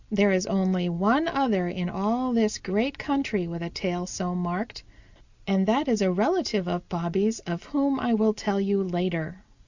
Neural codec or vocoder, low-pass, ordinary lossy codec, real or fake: none; 7.2 kHz; Opus, 64 kbps; real